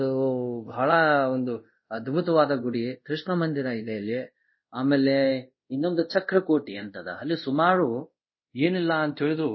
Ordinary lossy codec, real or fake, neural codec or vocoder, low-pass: MP3, 24 kbps; fake; codec, 24 kHz, 0.5 kbps, DualCodec; 7.2 kHz